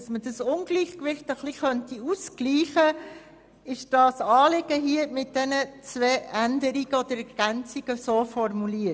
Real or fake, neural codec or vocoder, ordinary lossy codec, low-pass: real; none; none; none